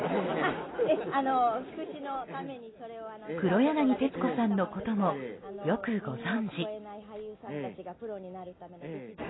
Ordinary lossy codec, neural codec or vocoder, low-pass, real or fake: AAC, 16 kbps; none; 7.2 kHz; real